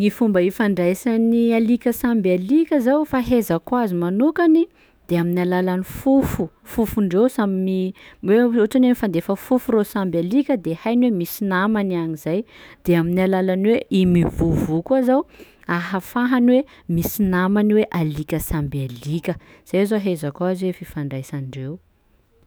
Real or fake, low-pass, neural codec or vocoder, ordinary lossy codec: fake; none; autoencoder, 48 kHz, 128 numbers a frame, DAC-VAE, trained on Japanese speech; none